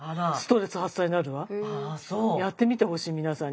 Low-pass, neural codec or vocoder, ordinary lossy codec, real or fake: none; none; none; real